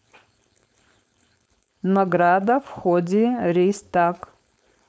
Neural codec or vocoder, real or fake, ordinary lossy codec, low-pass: codec, 16 kHz, 4.8 kbps, FACodec; fake; none; none